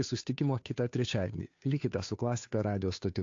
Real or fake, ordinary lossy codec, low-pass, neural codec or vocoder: fake; AAC, 48 kbps; 7.2 kHz; codec, 16 kHz, 2 kbps, FunCodec, trained on Chinese and English, 25 frames a second